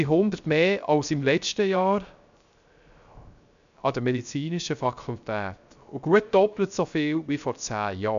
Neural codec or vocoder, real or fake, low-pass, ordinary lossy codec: codec, 16 kHz, 0.3 kbps, FocalCodec; fake; 7.2 kHz; none